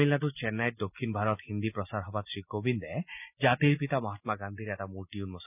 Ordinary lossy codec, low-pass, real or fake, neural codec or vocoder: none; 3.6 kHz; real; none